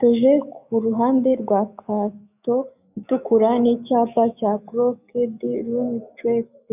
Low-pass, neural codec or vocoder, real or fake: 3.6 kHz; autoencoder, 48 kHz, 128 numbers a frame, DAC-VAE, trained on Japanese speech; fake